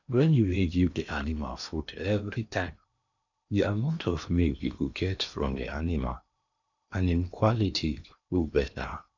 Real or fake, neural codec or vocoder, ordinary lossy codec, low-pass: fake; codec, 16 kHz in and 24 kHz out, 0.8 kbps, FocalCodec, streaming, 65536 codes; none; 7.2 kHz